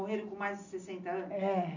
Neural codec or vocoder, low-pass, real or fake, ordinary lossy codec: none; 7.2 kHz; real; none